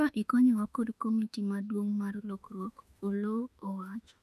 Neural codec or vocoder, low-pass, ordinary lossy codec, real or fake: autoencoder, 48 kHz, 32 numbers a frame, DAC-VAE, trained on Japanese speech; 14.4 kHz; none; fake